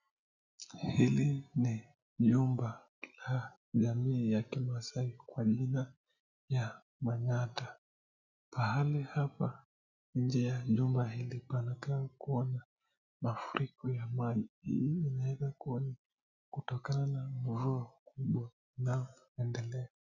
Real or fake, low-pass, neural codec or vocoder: real; 7.2 kHz; none